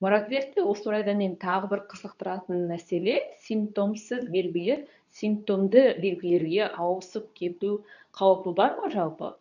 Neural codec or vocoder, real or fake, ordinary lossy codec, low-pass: codec, 24 kHz, 0.9 kbps, WavTokenizer, medium speech release version 2; fake; none; 7.2 kHz